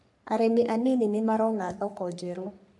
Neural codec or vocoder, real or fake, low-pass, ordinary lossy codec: codec, 44.1 kHz, 3.4 kbps, Pupu-Codec; fake; 10.8 kHz; none